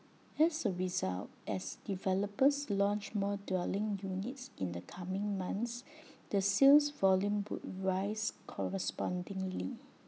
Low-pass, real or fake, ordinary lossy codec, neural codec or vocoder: none; real; none; none